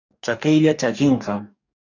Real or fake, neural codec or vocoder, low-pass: fake; codec, 44.1 kHz, 2.6 kbps, DAC; 7.2 kHz